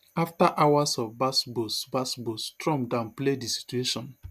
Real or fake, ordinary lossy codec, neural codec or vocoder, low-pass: real; none; none; 14.4 kHz